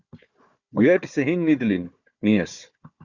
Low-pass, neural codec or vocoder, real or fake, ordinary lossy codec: 7.2 kHz; codec, 16 kHz, 4 kbps, FunCodec, trained on Chinese and English, 50 frames a second; fake; Opus, 64 kbps